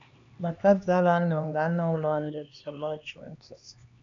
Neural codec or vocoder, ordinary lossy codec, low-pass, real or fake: codec, 16 kHz, 2 kbps, X-Codec, HuBERT features, trained on LibriSpeech; MP3, 64 kbps; 7.2 kHz; fake